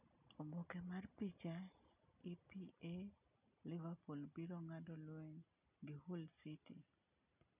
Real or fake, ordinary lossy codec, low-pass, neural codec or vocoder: real; none; 3.6 kHz; none